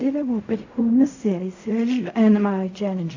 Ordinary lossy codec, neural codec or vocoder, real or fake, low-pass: AAC, 48 kbps; codec, 16 kHz in and 24 kHz out, 0.4 kbps, LongCat-Audio-Codec, fine tuned four codebook decoder; fake; 7.2 kHz